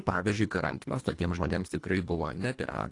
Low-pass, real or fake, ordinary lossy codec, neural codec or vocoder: 10.8 kHz; fake; AAC, 48 kbps; codec, 24 kHz, 1.5 kbps, HILCodec